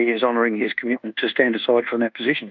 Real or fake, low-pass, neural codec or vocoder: fake; 7.2 kHz; codec, 24 kHz, 1.2 kbps, DualCodec